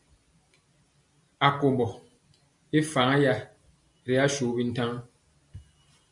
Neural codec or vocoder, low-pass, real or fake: none; 10.8 kHz; real